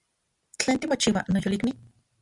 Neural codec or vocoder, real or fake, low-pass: none; real; 10.8 kHz